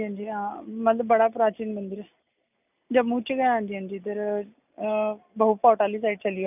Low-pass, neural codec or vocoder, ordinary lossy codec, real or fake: 3.6 kHz; none; none; real